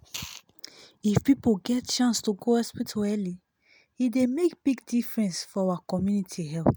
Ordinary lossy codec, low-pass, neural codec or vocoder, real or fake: none; none; none; real